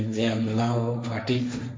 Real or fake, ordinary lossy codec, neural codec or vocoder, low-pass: fake; none; codec, 16 kHz, 1.1 kbps, Voila-Tokenizer; none